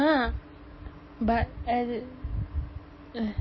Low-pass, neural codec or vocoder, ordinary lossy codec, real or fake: 7.2 kHz; none; MP3, 24 kbps; real